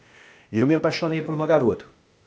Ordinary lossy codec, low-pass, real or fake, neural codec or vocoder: none; none; fake; codec, 16 kHz, 0.8 kbps, ZipCodec